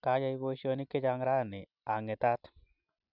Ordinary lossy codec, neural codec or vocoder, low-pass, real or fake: none; none; 5.4 kHz; real